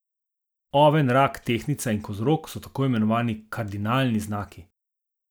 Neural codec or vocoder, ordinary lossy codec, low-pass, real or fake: none; none; none; real